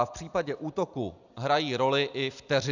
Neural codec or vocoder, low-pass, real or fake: none; 7.2 kHz; real